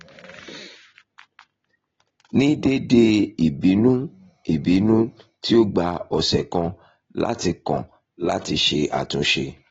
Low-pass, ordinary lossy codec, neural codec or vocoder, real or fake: 7.2 kHz; AAC, 24 kbps; none; real